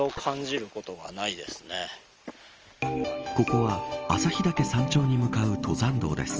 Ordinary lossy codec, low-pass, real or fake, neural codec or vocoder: Opus, 24 kbps; 7.2 kHz; real; none